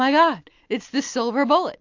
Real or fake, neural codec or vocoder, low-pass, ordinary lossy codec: real; none; 7.2 kHz; AAC, 48 kbps